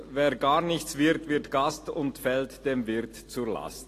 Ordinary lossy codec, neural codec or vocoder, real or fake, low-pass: AAC, 48 kbps; none; real; 14.4 kHz